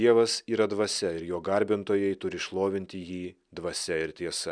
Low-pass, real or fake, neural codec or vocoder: 9.9 kHz; real; none